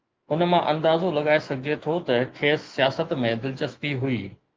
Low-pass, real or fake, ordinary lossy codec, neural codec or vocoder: 7.2 kHz; fake; Opus, 32 kbps; autoencoder, 48 kHz, 128 numbers a frame, DAC-VAE, trained on Japanese speech